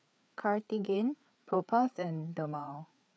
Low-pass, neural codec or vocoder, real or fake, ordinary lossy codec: none; codec, 16 kHz, 4 kbps, FreqCodec, larger model; fake; none